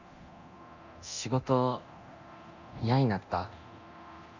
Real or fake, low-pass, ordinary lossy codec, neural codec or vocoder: fake; 7.2 kHz; none; codec, 24 kHz, 0.9 kbps, DualCodec